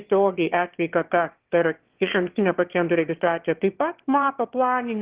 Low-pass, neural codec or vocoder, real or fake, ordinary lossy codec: 3.6 kHz; autoencoder, 22.05 kHz, a latent of 192 numbers a frame, VITS, trained on one speaker; fake; Opus, 16 kbps